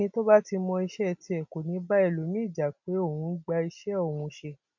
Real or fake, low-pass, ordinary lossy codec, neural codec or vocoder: real; 7.2 kHz; none; none